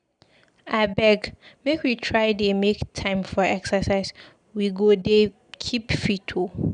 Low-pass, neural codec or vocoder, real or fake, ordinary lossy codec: 9.9 kHz; none; real; none